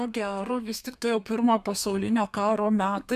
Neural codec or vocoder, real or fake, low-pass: codec, 32 kHz, 1.9 kbps, SNAC; fake; 14.4 kHz